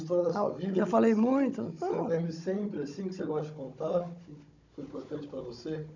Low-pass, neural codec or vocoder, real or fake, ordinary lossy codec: 7.2 kHz; codec, 16 kHz, 16 kbps, FunCodec, trained on Chinese and English, 50 frames a second; fake; none